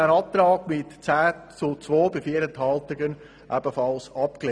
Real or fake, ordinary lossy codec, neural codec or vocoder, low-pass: real; none; none; 9.9 kHz